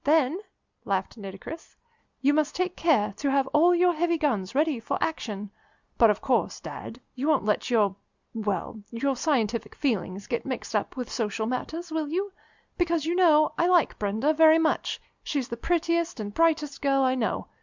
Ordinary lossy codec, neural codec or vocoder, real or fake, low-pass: Opus, 64 kbps; none; real; 7.2 kHz